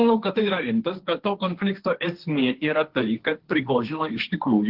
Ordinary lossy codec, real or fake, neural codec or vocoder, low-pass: Opus, 16 kbps; fake; codec, 16 kHz, 1.1 kbps, Voila-Tokenizer; 5.4 kHz